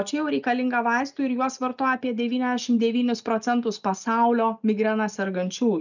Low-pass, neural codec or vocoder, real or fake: 7.2 kHz; none; real